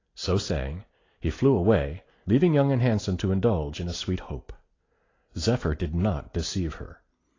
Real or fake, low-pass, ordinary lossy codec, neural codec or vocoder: real; 7.2 kHz; AAC, 32 kbps; none